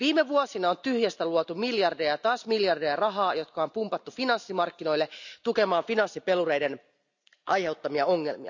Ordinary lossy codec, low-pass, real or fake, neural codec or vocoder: none; 7.2 kHz; real; none